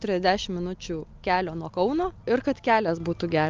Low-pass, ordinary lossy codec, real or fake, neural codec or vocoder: 7.2 kHz; Opus, 24 kbps; real; none